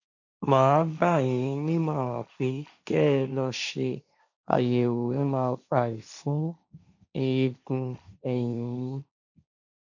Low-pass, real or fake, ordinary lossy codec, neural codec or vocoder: 7.2 kHz; fake; none; codec, 16 kHz, 1.1 kbps, Voila-Tokenizer